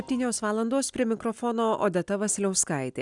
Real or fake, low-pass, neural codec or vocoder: real; 10.8 kHz; none